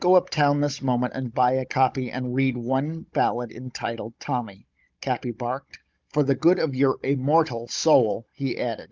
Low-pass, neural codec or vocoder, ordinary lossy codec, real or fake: 7.2 kHz; codec, 16 kHz, 16 kbps, FunCodec, trained on Chinese and English, 50 frames a second; Opus, 24 kbps; fake